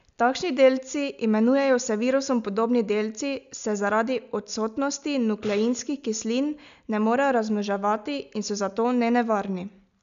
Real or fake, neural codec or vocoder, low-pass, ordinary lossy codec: real; none; 7.2 kHz; none